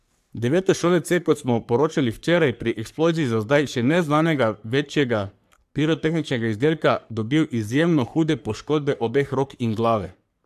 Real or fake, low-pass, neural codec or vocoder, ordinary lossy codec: fake; 14.4 kHz; codec, 44.1 kHz, 3.4 kbps, Pupu-Codec; AAC, 96 kbps